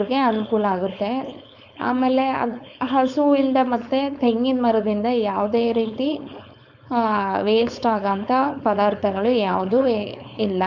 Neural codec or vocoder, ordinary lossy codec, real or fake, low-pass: codec, 16 kHz, 4.8 kbps, FACodec; none; fake; 7.2 kHz